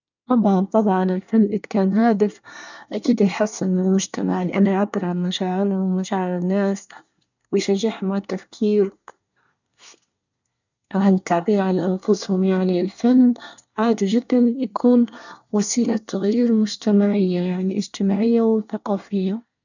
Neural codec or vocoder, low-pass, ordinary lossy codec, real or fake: codec, 24 kHz, 1 kbps, SNAC; 7.2 kHz; none; fake